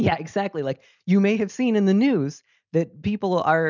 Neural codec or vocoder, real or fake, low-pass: none; real; 7.2 kHz